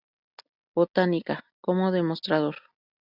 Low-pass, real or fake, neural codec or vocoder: 5.4 kHz; real; none